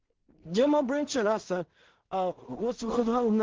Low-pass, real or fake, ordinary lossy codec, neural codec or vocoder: 7.2 kHz; fake; Opus, 16 kbps; codec, 16 kHz in and 24 kHz out, 0.4 kbps, LongCat-Audio-Codec, two codebook decoder